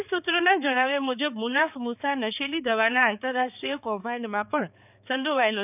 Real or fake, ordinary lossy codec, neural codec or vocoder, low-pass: fake; none; codec, 16 kHz, 4 kbps, X-Codec, HuBERT features, trained on balanced general audio; 3.6 kHz